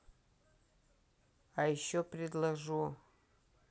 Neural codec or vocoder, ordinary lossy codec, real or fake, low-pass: none; none; real; none